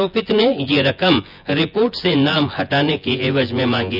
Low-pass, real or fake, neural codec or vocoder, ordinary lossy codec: 5.4 kHz; fake; vocoder, 24 kHz, 100 mel bands, Vocos; none